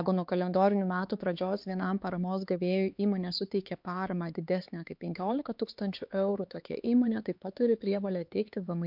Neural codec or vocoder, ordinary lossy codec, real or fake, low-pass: codec, 16 kHz, 4 kbps, X-Codec, HuBERT features, trained on LibriSpeech; MP3, 48 kbps; fake; 5.4 kHz